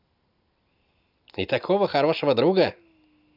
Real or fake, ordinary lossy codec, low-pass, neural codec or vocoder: real; none; 5.4 kHz; none